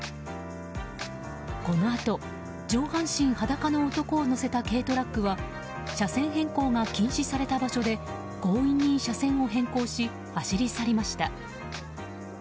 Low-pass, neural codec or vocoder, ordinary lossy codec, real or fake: none; none; none; real